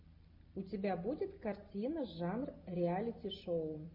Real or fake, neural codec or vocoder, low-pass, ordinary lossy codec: real; none; 5.4 kHz; MP3, 32 kbps